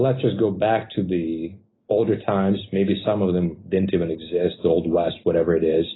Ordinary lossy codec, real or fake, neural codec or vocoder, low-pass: AAC, 16 kbps; real; none; 7.2 kHz